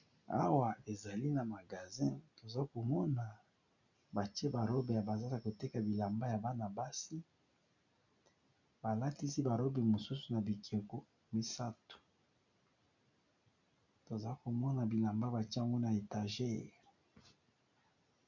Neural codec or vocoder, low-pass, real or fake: none; 7.2 kHz; real